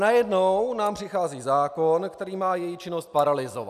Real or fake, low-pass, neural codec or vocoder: real; 14.4 kHz; none